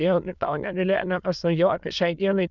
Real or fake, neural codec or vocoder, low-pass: fake; autoencoder, 22.05 kHz, a latent of 192 numbers a frame, VITS, trained on many speakers; 7.2 kHz